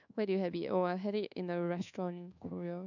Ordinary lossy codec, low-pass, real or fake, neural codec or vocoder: none; 7.2 kHz; fake; codec, 24 kHz, 1.2 kbps, DualCodec